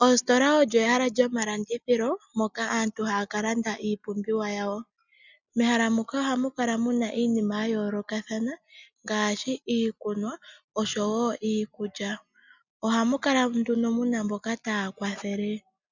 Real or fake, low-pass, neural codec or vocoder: real; 7.2 kHz; none